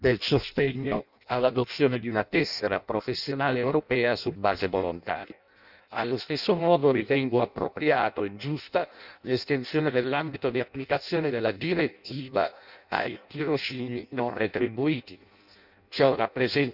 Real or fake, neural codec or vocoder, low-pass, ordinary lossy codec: fake; codec, 16 kHz in and 24 kHz out, 0.6 kbps, FireRedTTS-2 codec; 5.4 kHz; none